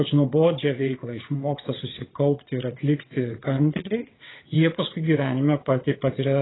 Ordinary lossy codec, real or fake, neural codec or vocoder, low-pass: AAC, 16 kbps; fake; vocoder, 22.05 kHz, 80 mel bands, WaveNeXt; 7.2 kHz